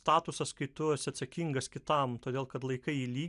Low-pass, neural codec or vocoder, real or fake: 10.8 kHz; none; real